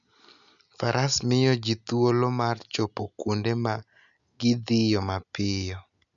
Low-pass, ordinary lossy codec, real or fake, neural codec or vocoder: 7.2 kHz; none; real; none